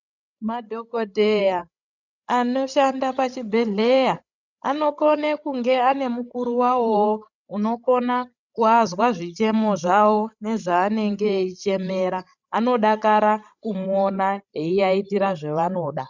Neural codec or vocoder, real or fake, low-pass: codec, 16 kHz, 16 kbps, FreqCodec, larger model; fake; 7.2 kHz